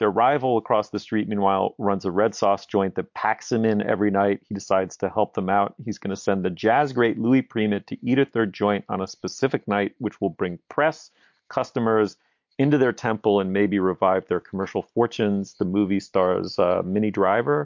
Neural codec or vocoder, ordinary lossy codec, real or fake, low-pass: none; MP3, 48 kbps; real; 7.2 kHz